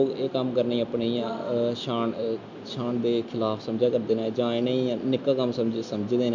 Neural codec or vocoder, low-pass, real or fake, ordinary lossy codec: none; 7.2 kHz; real; none